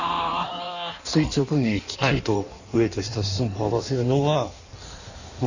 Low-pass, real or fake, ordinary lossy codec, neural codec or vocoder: 7.2 kHz; fake; none; codec, 16 kHz in and 24 kHz out, 1.1 kbps, FireRedTTS-2 codec